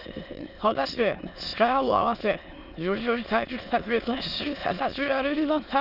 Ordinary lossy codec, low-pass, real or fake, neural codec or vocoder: none; 5.4 kHz; fake; autoencoder, 22.05 kHz, a latent of 192 numbers a frame, VITS, trained on many speakers